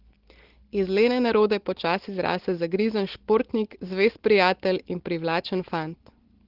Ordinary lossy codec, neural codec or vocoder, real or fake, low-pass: Opus, 16 kbps; none; real; 5.4 kHz